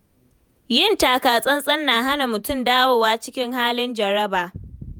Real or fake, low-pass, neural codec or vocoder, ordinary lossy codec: fake; none; vocoder, 48 kHz, 128 mel bands, Vocos; none